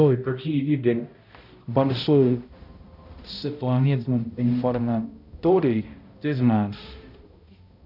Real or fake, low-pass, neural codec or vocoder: fake; 5.4 kHz; codec, 16 kHz, 0.5 kbps, X-Codec, HuBERT features, trained on balanced general audio